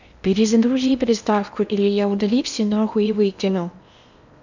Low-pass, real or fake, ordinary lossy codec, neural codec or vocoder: 7.2 kHz; fake; none; codec, 16 kHz in and 24 kHz out, 0.8 kbps, FocalCodec, streaming, 65536 codes